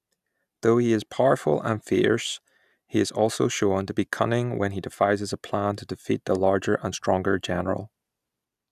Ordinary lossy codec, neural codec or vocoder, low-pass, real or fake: none; none; 14.4 kHz; real